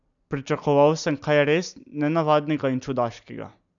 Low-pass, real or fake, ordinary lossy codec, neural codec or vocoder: 7.2 kHz; real; none; none